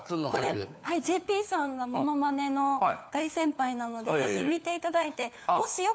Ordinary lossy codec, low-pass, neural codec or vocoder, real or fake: none; none; codec, 16 kHz, 4 kbps, FunCodec, trained on LibriTTS, 50 frames a second; fake